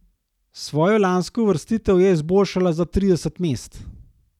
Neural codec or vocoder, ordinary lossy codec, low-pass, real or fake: none; none; 19.8 kHz; real